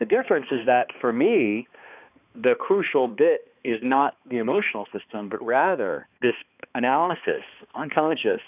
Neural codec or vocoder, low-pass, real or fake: codec, 16 kHz, 2 kbps, X-Codec, HuBERT features, trained on balanced general audio; 3.6 kHz; fake